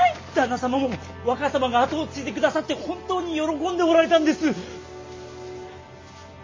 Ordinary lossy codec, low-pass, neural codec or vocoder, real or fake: MP3, 32 kbps; 7.2 kHz; none; real